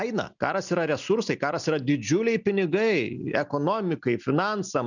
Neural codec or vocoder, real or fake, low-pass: none; real; 7.2 kHz